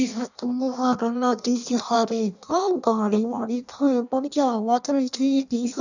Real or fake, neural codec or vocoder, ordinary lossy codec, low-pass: fake; codec, 16 kHz in and 24 kHz out, 0.6 kbps, FireRedTTS-2 codec; none; 7.2 kHz